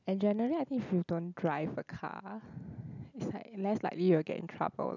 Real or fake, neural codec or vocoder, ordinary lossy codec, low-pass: real; none; none; 7.2 kHz